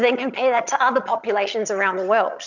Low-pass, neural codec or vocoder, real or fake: 7.2 kHz; vocoder, 22.05 kHz, 80 mel bands, HiFi-GAN; fake